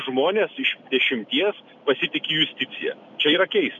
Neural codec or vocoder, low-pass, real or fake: none; 7.2 kHz; real